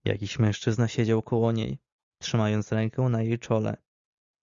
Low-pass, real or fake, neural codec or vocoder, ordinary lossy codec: 7.2 kHz; real; none; Opus, 64 kbps